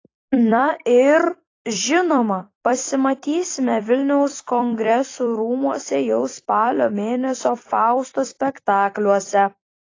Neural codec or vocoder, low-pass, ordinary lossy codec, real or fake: vocoder, 44.1 kHz, 128 mel bands every 256 samples, BigVGAN v2; 7.2 kHz; AAC, 32 kbps; fake